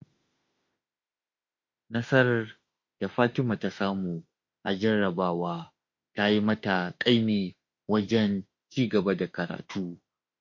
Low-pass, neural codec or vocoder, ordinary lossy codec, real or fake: 7.2 kHz; autoencoder, 48 kHz, 32 numbers a frame, DAC-VAE, trained on Japanese speech; MP3, 48 kbps; fake